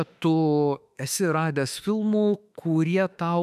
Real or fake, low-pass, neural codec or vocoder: fake; 14.4 kHz; autoencoder, 48 kHz, 32 numbers a frame, DAC-VAE, trained on Japanese speech